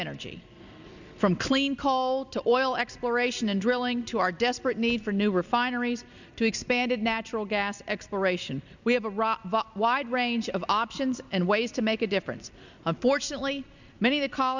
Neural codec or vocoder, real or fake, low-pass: none; real; 7.2 kHz